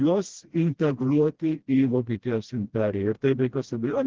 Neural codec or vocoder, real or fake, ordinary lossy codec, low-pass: codec, 16 kHz, 1 kbps, FreqCodec, smaller model; fake; Opus, 16 kbps; 7.2 kHz